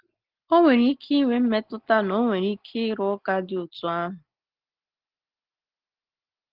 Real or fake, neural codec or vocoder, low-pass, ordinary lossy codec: real; none; 5.4 kHz; Opus, 16 kbps